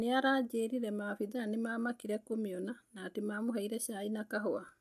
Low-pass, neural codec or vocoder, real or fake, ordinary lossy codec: 14.4 kHz; none; real; AAC, 96 kbps